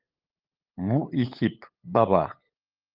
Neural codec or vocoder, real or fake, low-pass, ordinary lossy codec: codec, 16 kHz, 8 kbps, FunCodec, trained on LibriTTS, 25 frames a second; fake; 5.4 kHz; Opus, 32 kbps